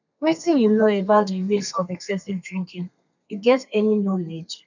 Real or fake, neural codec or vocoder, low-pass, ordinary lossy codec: fake; codec, 32 kHz, 1.9 kbps, SNAC; 7.2 kHz; none